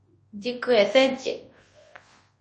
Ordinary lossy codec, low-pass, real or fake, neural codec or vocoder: MP3, 32 kbps; 10.8 kHz; fake; codec, 24 kHz, 0.9 kbps, WavTokenizer, large speech release